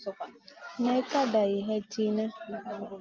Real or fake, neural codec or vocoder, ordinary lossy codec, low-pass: real; none; Opus, 24 kbps; 7.2 kHz